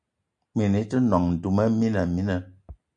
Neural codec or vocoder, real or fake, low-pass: none; real; 9.9 kHz